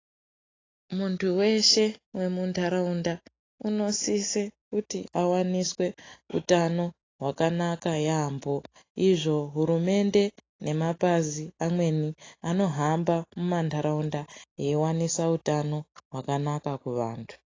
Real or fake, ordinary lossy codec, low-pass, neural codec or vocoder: real; AAC, 32 kbps; 7.2 kHz; none